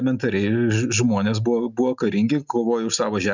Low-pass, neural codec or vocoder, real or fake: 7.2 kHz; none; real